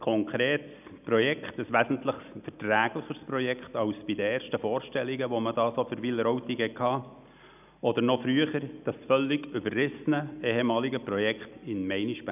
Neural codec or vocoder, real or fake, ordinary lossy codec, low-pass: none; real; none; 3.6 kHz